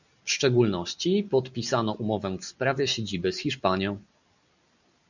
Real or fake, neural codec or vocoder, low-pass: real; none; 7.2 kHz